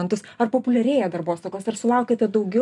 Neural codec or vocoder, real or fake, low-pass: none; real; 10.8 kHz